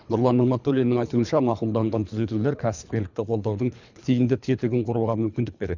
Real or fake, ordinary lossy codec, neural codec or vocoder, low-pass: fake; none; codec, 24 kHz, 3 kbps, HILCodec; 7.2 kHz